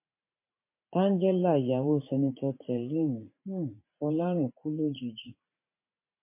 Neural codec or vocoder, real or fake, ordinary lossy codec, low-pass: vocoder, 44.1 kHz, 80 mel bands, Vocos; fake; MP3, 24 kbps; 3.6 kHz